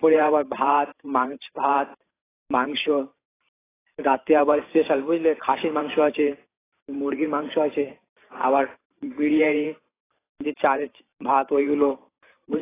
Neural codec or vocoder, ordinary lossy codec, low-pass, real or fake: vocoder, 44.1 kHz, 128 mel bands every 512 samples, BigVGAN v2; AAC, 16 kbps; 3.6 kHz; fake